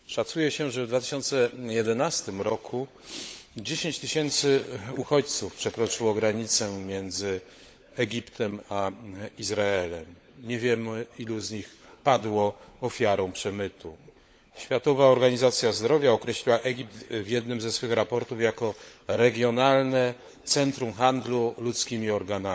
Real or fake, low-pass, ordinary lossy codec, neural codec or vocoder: fake; none; none; codec, 16 kHz, 16 kbps, FunCodec, trained on LibriTTS, 50 frames a second